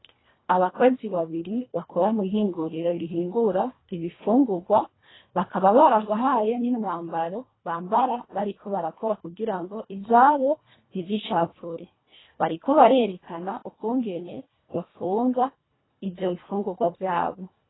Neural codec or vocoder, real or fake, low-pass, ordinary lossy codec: codec, 24 kHz, 1.5 kbps, HILCodec; fake; 7.2 kHz; AAC, 16 kbps